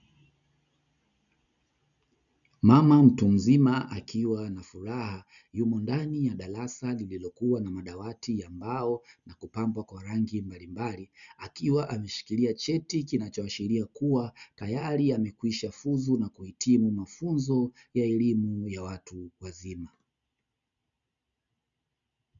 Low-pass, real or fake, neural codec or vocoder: 7.2 kHz; real; none